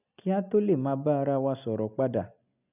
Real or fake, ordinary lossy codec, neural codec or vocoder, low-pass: real; none; none; 3.6 kHz